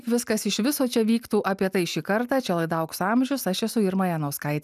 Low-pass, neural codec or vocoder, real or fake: 14.4 kHz; none; real